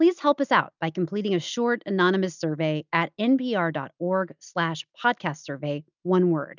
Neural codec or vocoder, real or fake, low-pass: none; real; 7.2 kHz